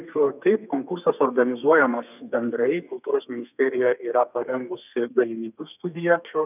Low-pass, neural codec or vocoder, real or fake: 3.6 kHz; codec, 32 kHz, 1.9 kbps, SNAC; fake